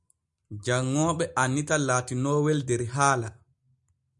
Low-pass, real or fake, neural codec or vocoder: 10.8 kHz; real; none